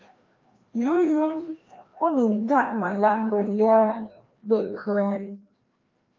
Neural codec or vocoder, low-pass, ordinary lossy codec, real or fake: codec, 16 kHz, 1 kbps, FreqCodec, larger model; 7.2 kHz; Opus, 32 kbps; fake